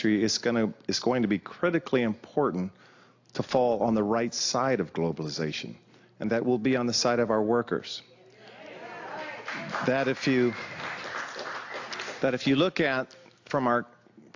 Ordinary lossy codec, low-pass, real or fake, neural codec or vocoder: AAC, 48 kbps; 7.2 kHz; real; none